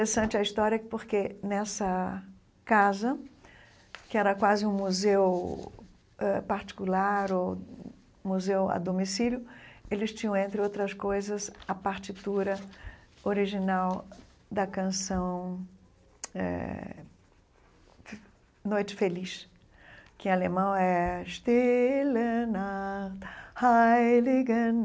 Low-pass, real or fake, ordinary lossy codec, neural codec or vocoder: none; real; none; none